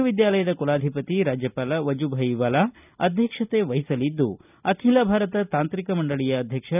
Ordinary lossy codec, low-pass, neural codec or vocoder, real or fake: none; 3.6 kHz; none; real